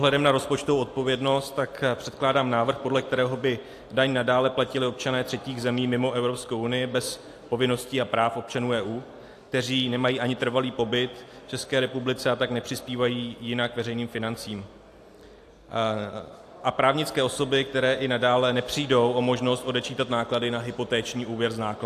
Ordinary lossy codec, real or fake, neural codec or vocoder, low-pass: AAC, 64 kbps; real; none; 14.4 kHz